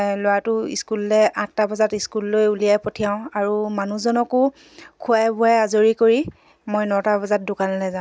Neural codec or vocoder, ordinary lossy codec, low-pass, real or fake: none; none; none; real